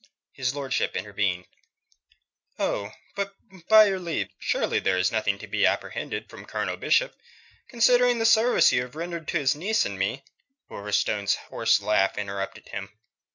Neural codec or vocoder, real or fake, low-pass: none; real; 7.2 kHz